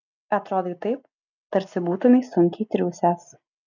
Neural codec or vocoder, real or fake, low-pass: none; real; 7.2 kHz